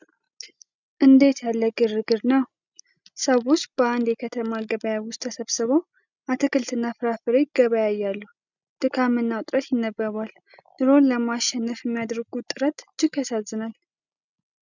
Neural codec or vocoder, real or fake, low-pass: none; real; 7.2 kHz